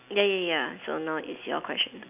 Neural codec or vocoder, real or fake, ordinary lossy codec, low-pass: none; real; none; 3.6 kHz